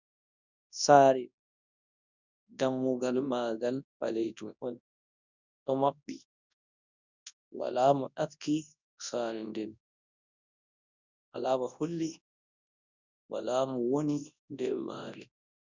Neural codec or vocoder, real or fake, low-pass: codec, 24 kHz, 0.9 kbps, WavTokenizer, large speech release; fake; 7.2 kHz